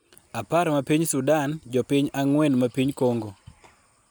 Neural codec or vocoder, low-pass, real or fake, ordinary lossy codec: none; none; real; none